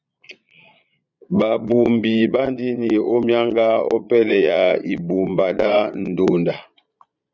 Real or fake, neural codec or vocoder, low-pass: fake; vocoder, 22.05 kHz, 80 mel bands, Vocos; 7.2 kHz